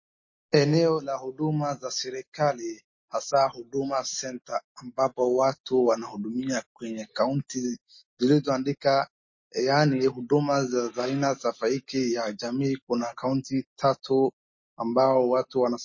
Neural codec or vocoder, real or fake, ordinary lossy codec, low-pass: none; real; MP3, 32 kbps; 7.2 kHz